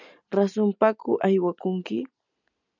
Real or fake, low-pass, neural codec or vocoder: real; 7.2 kHz; none